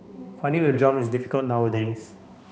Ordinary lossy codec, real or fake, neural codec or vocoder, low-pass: none; fake; codec, 16 kHz, 2 kbps, X-Codec, HuBERT features, trained on balanced general audio; none